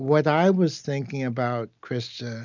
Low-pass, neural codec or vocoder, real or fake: 7.2 kHz; none; real